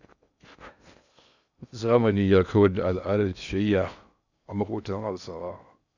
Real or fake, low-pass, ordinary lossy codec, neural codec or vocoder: fake; 7.2 kHz; none; codec, 16 kHz in and 24 kHz out, 0.6 kbps, FocalCodec, streaming, 2048 codes